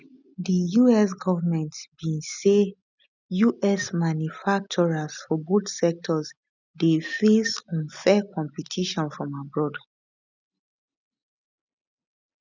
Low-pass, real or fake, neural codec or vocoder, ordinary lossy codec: 7.2 kHz; real; none; none